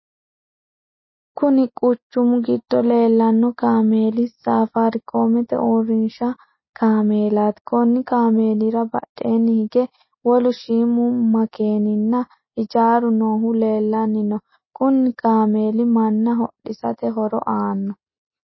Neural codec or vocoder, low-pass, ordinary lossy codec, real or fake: none; 7.2 kHz; MP3, 24 kbps; real